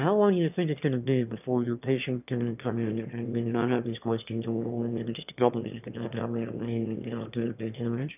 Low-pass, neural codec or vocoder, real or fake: 3.6 kHz; autoencoder, 22.05 kHz, a latent of 192 numbers a frame, VITS, trained on one speaker; fake